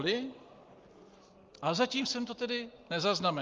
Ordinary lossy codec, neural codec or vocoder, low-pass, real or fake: Opus, 32 kbps; none; 7.2 kHz; real